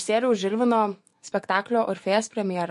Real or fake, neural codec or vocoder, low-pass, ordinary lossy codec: fake; autoencoder, 48 kHz, 128 numbers a frame, DAC-VAE, trained on Japanese speech; 14.4 kHz; MP3, 48 kbps